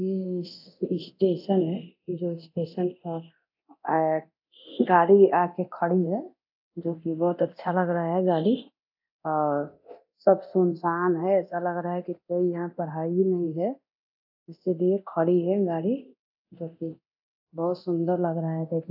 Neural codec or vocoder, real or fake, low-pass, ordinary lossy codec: codec, 24 kHz, 0.9 kbps, DualCodec; fake; 5.4 kHz; AAC, 48 kbps